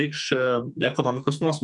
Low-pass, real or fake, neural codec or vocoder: 10.8 kHz; fake; codec, 44.1 kHz, 2.6 kbps, SNAC